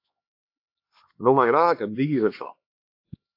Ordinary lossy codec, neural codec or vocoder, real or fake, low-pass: AAC, 32 kbps; codec, 16 kHz, 2 kbps, X-Codec, HuBERT features, trained on LibriSpeech; fake; 5.4 kHz